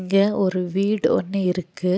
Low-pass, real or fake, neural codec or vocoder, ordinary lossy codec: none; real; none; none